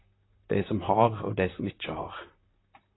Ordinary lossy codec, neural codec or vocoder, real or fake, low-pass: AAC, 16 kbps; vocoder, 44.1 kHz, 128 mel bands, Pupu-Vocoder; fake; 7.2 kHz